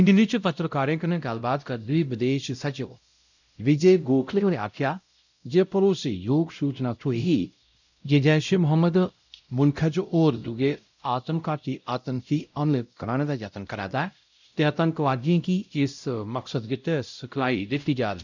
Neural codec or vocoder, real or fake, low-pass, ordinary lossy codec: codec, 16 kHz, 0.5 kbps, X-Codec, WavLM features, trained on Multilingual LibriSpeech; fake; 7.2 kHz; none